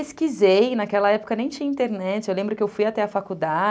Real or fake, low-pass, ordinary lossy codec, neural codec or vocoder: real; none; none; none